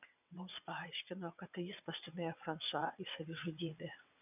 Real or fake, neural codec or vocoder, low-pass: fake; vocoder, 22.05 kHz, 80 mel bands, WaveNeXt; 3.6 kHz